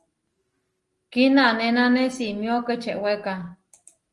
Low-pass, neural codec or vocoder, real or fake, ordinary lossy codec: 10.8 kHz; none; real; Opus, 24 kbps